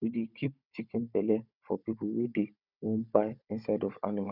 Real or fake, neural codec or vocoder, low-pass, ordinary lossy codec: fake; codec, 16 kHz, 16 kbps, FunCodec, trained on Chinese and English, 50 frames a second; 5.4 kHz; none